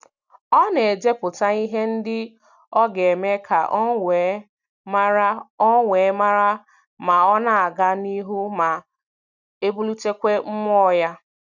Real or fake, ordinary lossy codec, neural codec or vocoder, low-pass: real; none; none; 7.2 kHz